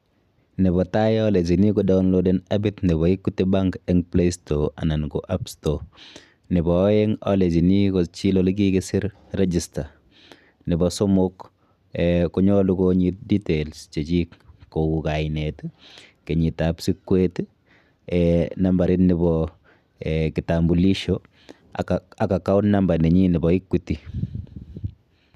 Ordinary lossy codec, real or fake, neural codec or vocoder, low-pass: none; real; none; 14.4 kHz